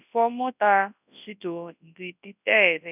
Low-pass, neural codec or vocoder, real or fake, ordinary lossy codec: 3.6 kHz; codec, 24 kHz, 0.9 kbps, WavTokenizer, large speech release; fake; none